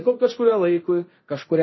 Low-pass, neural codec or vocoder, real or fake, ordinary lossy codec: 7.2 kHz; codec, 24 kHz, 0.9 kbps, DualCodec; fake; MP3, 24 kbps